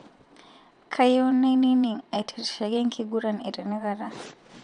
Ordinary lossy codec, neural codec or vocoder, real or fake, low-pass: none; vocoder, 22.05 kHz, 80 mel bands, Vocos; fake; 9.9 kHz